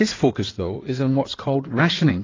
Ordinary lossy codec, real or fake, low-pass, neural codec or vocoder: AAC, 32 kbps; fake; 7.2 kHz; codec, 16 kHz in and 24 kHz out, 2.2 kbps, FireRedTTS-2 codec